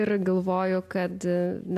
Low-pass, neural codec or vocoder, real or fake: 14.4 kHz; none; real